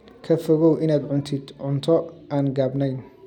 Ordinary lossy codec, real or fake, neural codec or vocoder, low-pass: none; real; none; 19.8 kHz